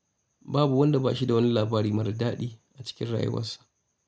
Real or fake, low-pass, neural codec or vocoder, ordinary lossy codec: real; none; none; none